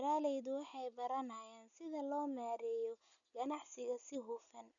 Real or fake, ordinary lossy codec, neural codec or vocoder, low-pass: real; none; none; 7.2 kHz